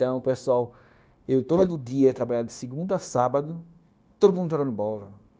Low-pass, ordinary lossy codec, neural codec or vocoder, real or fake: none; none; codec, 16 kHz, 0.9 kbps, LongCat-Audio-Codec; fake